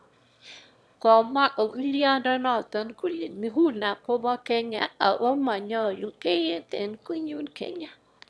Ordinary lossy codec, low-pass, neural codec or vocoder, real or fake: none; none; autoencoder, 22.05 kHz, a latent of 192 numbers a frame, VITS, trained on one speaker; fake